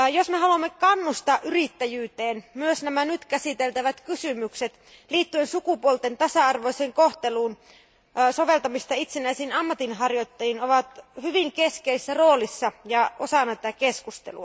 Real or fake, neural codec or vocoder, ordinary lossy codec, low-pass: real; none; none; none